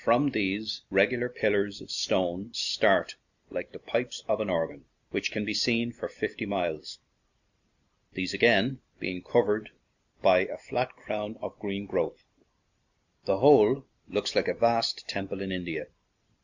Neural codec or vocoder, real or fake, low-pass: none; real; 7.2 kHz